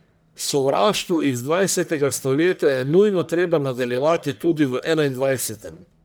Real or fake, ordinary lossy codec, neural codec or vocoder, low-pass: fake; none; codec, 44.1 kHz, 1.7 kbps, Pupu-Codec; none